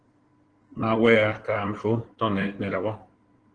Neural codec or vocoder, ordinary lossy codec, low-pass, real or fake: codec, 16 kHz in and 24 kHz out, 2.2 kbps, FireRedTTS-2 codec; Opus, 16 kbps; 9.9 kHz; fake